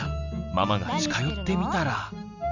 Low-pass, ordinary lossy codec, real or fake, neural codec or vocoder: 7.2 kHz; none; real; none